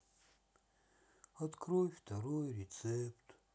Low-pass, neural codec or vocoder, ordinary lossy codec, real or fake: none; none; none; real